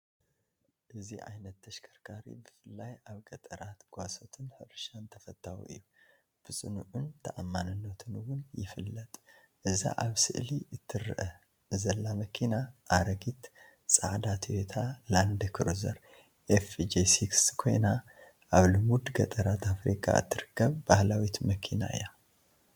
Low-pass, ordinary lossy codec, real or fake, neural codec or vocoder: 19.8 kHz; MP3, 96 kbps; real; none